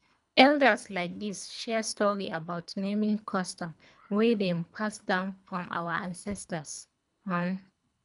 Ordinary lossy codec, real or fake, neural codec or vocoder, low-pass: none; fake; codec, 24 kHz, 3 kbps, HILCodec; 10.8 kHz